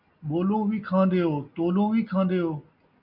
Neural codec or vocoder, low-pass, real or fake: none; 5.4 kHz; real